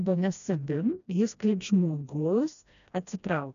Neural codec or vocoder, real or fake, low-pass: codec, 16 kHz, 1 kbps, FreqCodec, smaller model; fake; 7.2 kHz